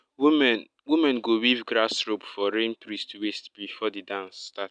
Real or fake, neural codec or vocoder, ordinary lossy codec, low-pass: real; none; none; 9.9 kHz